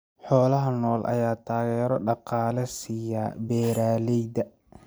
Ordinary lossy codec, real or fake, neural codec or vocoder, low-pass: none; fake; vocoder, 44.1 kHz, 128 mel bands every 256 samples, BigVGAN v2; none